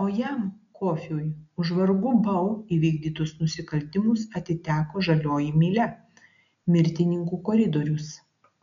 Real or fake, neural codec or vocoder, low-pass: real; none; 7.2 kHz